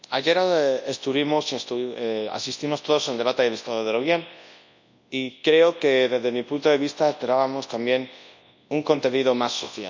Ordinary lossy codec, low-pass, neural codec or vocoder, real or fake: MP3, 64 kbps; 7.2 kHz; codec, 24 kHz, 0.9 kbps, WavTokenizer, large speech release; fake